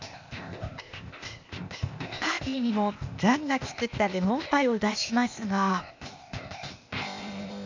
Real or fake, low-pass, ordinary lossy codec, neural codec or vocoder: fake; 7.2 kHz; MP3, 64 kbps; codec, 16 kHz, 0.8 kbps, ZipCodec